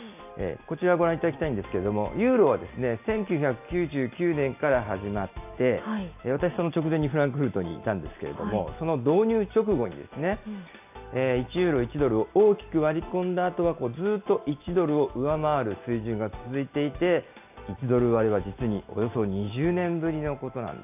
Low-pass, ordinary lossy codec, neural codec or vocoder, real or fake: 3.6 kHz; AAC, 32 kbps; none; real